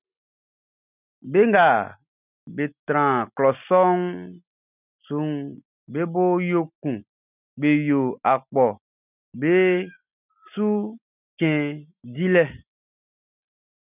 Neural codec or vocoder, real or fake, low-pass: none; real; 3.6 kHz